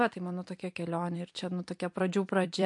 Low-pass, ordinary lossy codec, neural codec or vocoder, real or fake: 10.8 kHz; MP3, 64 kbps; vocoder, 44.1 kHz, 128 mel bands every 256 samples, BigVGAN v2; fake